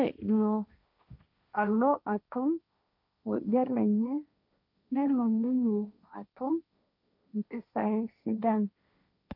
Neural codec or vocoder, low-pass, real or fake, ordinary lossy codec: codec, 16 kHz, 1.1 kbps, Voila-Tokenizer; 5.4 kHz; fake; none